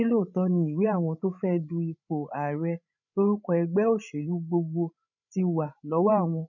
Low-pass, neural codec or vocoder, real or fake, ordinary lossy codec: 7.2 kHz; codec, 16 kHz, 16 kbps, FreqCodec, larger model; fake; none